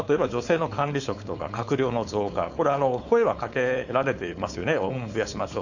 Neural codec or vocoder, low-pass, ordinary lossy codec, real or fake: codec, 16 kHz, 4.8 kbps, FACodec; 7.2 kHz; none; fake